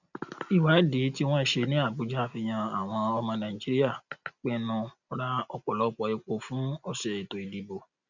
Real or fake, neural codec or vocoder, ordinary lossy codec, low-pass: real; none; none; 7.2 kHz